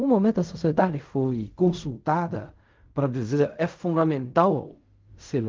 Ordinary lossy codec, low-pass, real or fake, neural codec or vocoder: Opus, 24 kbps; 7.2 kHz; fake; codec, 16 kHz in and 24 kHz out, 0.4 kbps, LongCat-Audio-Codec, fine tuned four codebook decoder